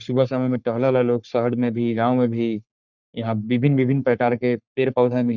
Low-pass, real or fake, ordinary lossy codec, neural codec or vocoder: 7.2 kHz; fake; none; codec, 44.1 kHz, 3.4 kbps, Pupu-Codec